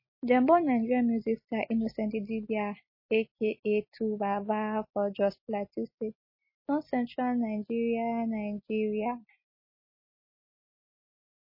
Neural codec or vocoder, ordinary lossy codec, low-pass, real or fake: none; MP3, 24 kbps; 5.4 kHz; real